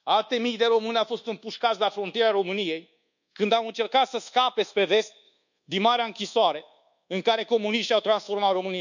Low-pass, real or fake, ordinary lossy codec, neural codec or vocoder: 7.2 kHz; fake; none; codec, 24 kHz, 1.2 kbps, DualCodec